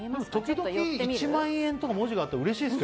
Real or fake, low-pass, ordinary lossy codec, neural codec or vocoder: real; none; none; none